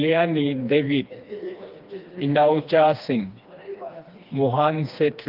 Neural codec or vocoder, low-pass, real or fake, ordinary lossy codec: codec, 16 kHz, 2 kbps, FreqCodec, smaller model; 5.4 kHz; fake; Opus, 24 kbps